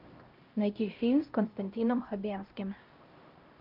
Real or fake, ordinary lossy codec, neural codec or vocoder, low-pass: fake; Opus, 32 kbps; codec, 16 kHz, 0.5 kbps, X-Codec, HuBERT features, trained on LibriSpeech; 5.4 kHz